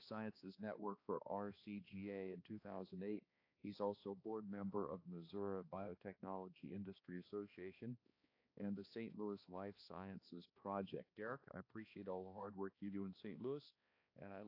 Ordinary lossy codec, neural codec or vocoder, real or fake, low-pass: AAC, 32 kbps; codec, 16 kHz, 2 kbps, X-Codec, HuBERT features, trained on balanced general audio; fake; 5.4 kHz